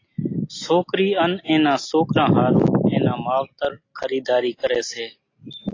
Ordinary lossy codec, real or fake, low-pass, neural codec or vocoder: AAC, 32 kbps; real; 7.2 kHz; none